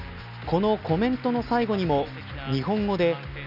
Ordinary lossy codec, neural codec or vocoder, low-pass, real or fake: none; none; 5.4 kHz; real